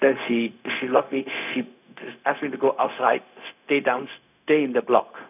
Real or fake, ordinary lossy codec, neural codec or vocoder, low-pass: fake; none; codec, 16 kHz, 0.4 kbps, LongCat-Audio-Codec; 3.6 kHz